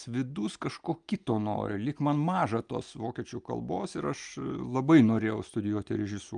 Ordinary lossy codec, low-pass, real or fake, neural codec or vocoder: Opus, 32 kbps; 9.9 kHz; real; none